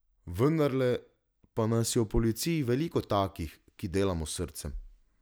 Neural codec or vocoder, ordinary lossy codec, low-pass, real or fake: none; none; none; real